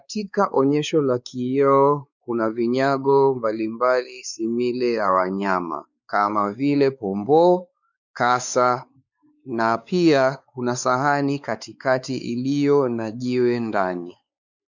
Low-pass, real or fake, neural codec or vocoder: 7.2 kHz; fake; codec, 16 kHz, 4 kbps, X-Codec, WavLM features, trained on Multilingual LibriSpeech